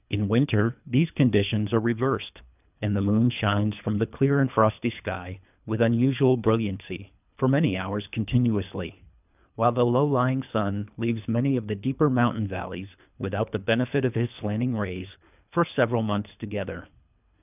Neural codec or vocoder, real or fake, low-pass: codec, 24 kHz, 3 kbps, HILCodec; fake; 3.6 kHz